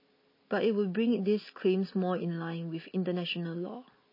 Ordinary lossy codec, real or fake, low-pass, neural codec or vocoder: MP3, 24 kbps; real; 5.4 kHz; none